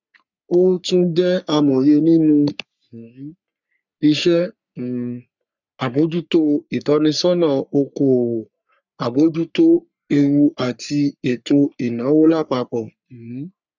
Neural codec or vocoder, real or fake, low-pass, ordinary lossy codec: codec, 44.1 kHz, 3.4 kbps, Pupu-Codec; fake; 7.2 kHz; none